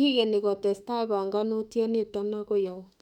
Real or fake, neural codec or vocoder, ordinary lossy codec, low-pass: fake; autoencoder, 48 kHz, 32 numbers a frame, DAC-VAE, trained on Japanese speech; none; 19.8 kHz